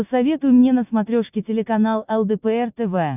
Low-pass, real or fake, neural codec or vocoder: 3.6 kHz; real; none